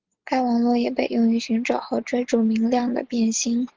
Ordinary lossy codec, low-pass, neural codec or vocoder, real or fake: Opus, 16 kbps; 7.2 kHz; none; real